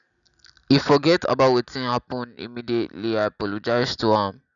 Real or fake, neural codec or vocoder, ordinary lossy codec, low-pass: real; none; none; 7.2 kHz